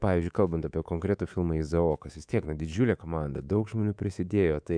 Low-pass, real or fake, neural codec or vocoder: 9.9 kHz; fake; codec, 24 kHz, 3.1 kbps, DualCodec